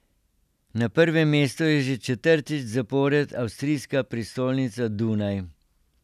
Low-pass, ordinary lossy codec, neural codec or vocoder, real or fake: 14.4 kHz; none; none; real